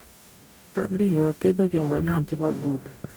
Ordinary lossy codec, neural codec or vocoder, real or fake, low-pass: none; codec, 44.1 kHz, 0.9 kbps, DAC; fake; none